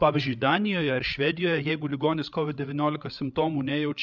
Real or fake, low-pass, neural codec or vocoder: fake; 7.2 kHz; codec, 16 kHz, 8 kbps, FreqCodec, larger model